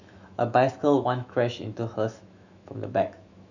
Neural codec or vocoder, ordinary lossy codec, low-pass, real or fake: autoencoder, 48 kHz, 128 numbers a frame, DAC-VAE, trained on Japanese speech; none; 7.2 kHz; fake